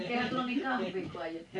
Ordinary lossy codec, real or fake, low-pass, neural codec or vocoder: none; real; 10.8 kHz; none